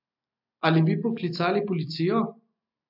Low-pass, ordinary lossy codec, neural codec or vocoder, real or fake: 5.4 kHz; AAC, 48 kbps; none; real